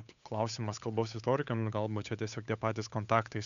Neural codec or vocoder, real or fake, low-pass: codec, 16 kHz, 8 kbps, FunCodec, trained on Chinese and English, 25 frames a second; fake; 7.2 kHz